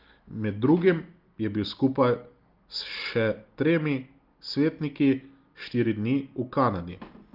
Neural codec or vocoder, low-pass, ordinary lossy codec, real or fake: none; 5.4 kHz; Opus, 32 kbps; real